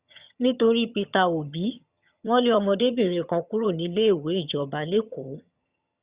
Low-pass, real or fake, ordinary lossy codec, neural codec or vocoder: 3.6 kHz; fake; Opus, 64 kbps; vocoder, 22.05 kHz, 80 mel bands, HiFi-GAN